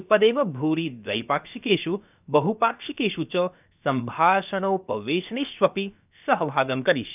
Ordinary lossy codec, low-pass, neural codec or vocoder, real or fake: none; 3.6 kHz; codec, 16 kHz, about 1 kbps, DyCAST, with the encoder's durations; fake